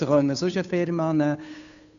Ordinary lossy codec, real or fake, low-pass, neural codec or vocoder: MP3, 96 kbps; fake; 7.2 kHz; codec, 16 kHz, 2 kbps, FunCodec, trained on Chinese and English, 25 frames a second